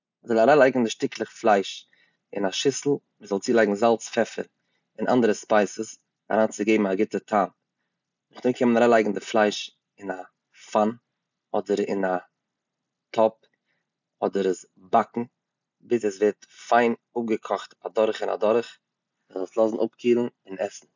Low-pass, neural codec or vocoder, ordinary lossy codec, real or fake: 7.2 kHz; none; none; real